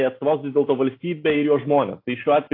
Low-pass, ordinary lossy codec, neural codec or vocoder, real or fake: 5.4 kHz; AAC, 32 kbps; none; real